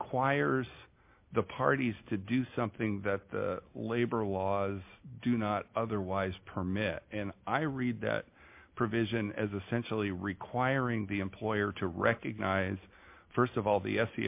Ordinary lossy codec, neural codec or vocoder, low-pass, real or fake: MP3, 32 kbps; none; 3.6 kHz; real